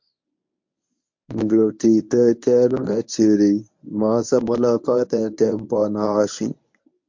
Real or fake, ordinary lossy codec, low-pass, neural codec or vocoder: fake; MP3, 48 kbps; 7.2 kHz; codec, 24 kHz, 0.9 kbps, WavTokenizer, medium speech release version 1